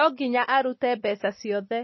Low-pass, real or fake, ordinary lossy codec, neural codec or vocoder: 7.2 kHz; real; MP3, 24 kbps; none